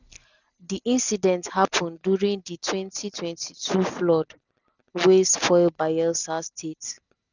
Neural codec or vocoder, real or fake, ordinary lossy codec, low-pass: none; real; none; 7.2 kHz